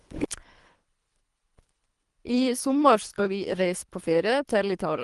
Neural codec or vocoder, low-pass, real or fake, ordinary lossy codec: codec, 24 kHz, 3 kbps, HILCodec; 10.8 kHz; fake; Opus, 24 kbps